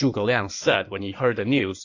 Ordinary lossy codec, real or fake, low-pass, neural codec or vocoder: AAC, 48 kbps; fake; 7.2 kHz; codec, 16 kHz in and 24 kHz out, 2.2 kbps, FireRedTTS-2 codec